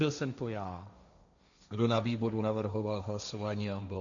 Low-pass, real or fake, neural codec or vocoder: 7.2 kHz; fake; codec, 16 kHz, 1.1 kbps, Voila-Tokenizer